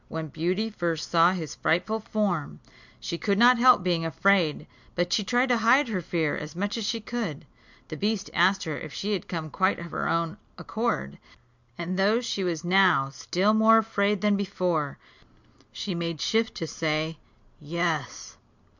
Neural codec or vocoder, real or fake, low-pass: none; real; 7.2 kHz